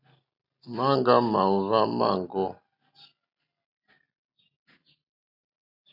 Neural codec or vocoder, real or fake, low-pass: none; real; 5.4 kHz